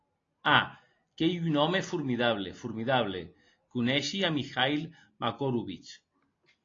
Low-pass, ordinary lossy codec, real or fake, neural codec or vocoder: 7.2 kHz; AAC, 32 kbps; real; none